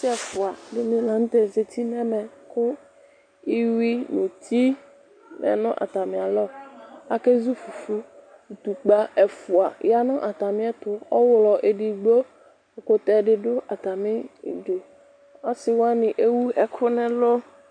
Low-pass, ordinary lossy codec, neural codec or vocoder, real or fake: 9.9 kHz; MP3, 64 kbps; none; real